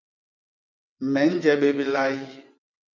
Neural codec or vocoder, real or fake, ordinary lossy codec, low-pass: vocoder, 22.05 kHz, 80 mel bands, WaveNeXt; fake; MP3, 64 kbps; 7.2 kHz